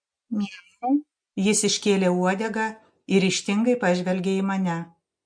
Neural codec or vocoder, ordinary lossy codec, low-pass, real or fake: none; MP3, 48 kbps; 9.9 kHz; real